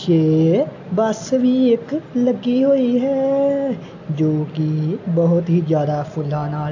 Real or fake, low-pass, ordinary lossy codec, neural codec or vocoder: real; 7.2 kHz; AAC, 48 kbps; none